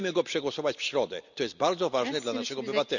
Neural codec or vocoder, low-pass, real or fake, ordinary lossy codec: none; 7.2 kHz; real; none